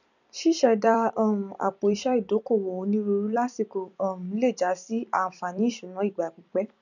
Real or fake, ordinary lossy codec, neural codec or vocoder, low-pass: fake; none; vocoder, 44.1 kHz, 128 mel bands every 256 samples, BigVGAN v2; 7.2 kHz